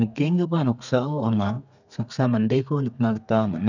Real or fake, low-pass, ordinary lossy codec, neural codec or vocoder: fake; 7.2 kHz; none; codec, 32 kHz, 1.9 kbps, SNAC